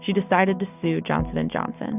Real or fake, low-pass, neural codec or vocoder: real; 3.6 kHz; none